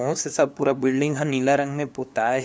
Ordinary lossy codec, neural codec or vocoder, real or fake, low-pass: none; codec, 16 kHz, 2 kbps, FunCodec, trained on LibriTTS, 25 frames a second; fake; none